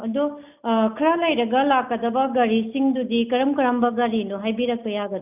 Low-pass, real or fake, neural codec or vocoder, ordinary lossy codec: 3.6 kHz; real; none; none